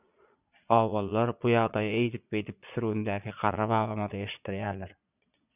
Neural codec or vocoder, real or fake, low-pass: vocoder, 22.05 kHz, 80 mel bands, Vocos; fake; 3.6 kHz